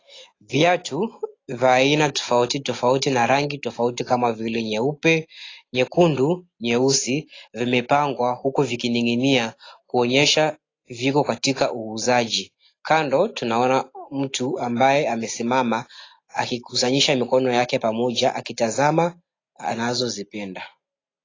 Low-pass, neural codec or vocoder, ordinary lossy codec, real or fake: 7.2 kHz; none; AAC, 32 kbps; real